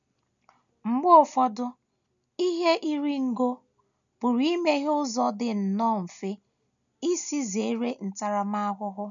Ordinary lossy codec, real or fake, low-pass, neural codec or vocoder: none; real; 7.2 kHz; none